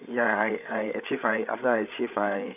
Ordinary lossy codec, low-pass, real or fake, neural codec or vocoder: none; 3.6 kHz; fake; codec, 16 kHz, 8 kbps, FreqCodec, larger model